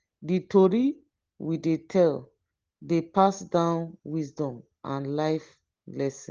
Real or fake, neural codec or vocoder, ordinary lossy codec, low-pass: real; none; Opus, 16 kbps; 7.2 kHz